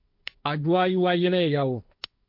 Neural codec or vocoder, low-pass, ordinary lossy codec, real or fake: codec, 16 kHz, 1.1 kbps, Voila-Tokenizer; 5.4 kHz; MP3, 48 kbps; fake